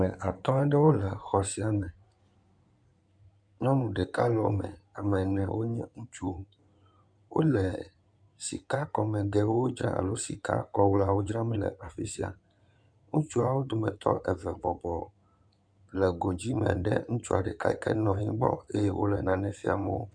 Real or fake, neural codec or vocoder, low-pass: fake; codec, 16 kHz in and 24 kHz out, 2.2 kbps, FireRedTTS-2 codec; 9.9 kHz